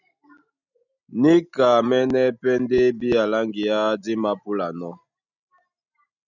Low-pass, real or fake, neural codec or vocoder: 7.2 kHz; real; none